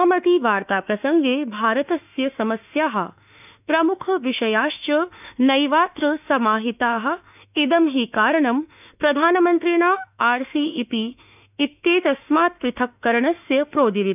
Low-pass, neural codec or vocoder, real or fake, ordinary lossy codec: 3.6 kHz; autoencoder, 48 kHz, 32 numbers a frame, DAC-VAE, trained on Japanese speech; fake; none